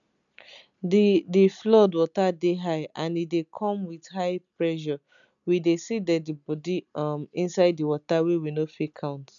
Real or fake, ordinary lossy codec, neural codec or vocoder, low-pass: real; none; none; 7.2 kHz